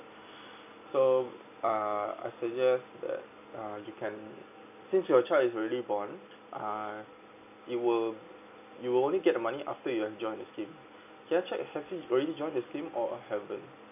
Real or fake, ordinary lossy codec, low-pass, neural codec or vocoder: real; none; 3.6 kHz; none